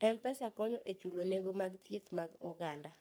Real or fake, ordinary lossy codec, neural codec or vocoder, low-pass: fake; none; codec, 44.1 kHz, 3.4 kbps, Pupu-Codec; none